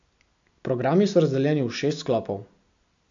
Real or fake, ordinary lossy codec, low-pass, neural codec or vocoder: real; AAC, 64 kbps; 7.2 kHz; none